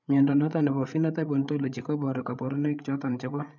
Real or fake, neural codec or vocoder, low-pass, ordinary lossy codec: fake; codec, 16 kHz, 8 kbps, FreqCodec, larger model; 7.2 kHz; none